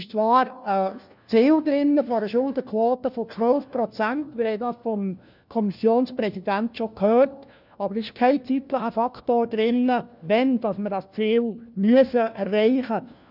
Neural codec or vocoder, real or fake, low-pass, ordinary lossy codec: codec, 16 kHz, 1 kbps, FunCodec, trained on LibriTTS, 50 frames a second; fake; 5.4 kHz; none